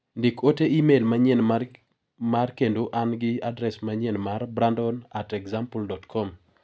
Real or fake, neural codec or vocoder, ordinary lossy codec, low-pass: real; none; none; none